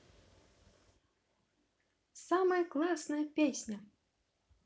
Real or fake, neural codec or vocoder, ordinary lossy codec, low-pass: real; none; none; none